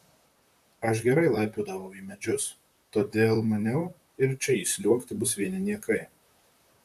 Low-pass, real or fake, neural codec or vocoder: 14.4 kHz; fake; vocoder, 44.1 kHz, 128 mel bands, Pupu-Vocoder